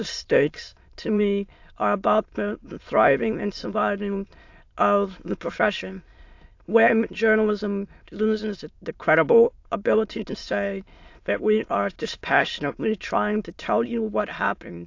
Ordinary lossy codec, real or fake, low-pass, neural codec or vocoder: AAC, 48 kbps; fake; 7.2 kHz; autoencoder, 22.05 kHz, a latent of 192 numbers a frame, VITS, trained on many speakers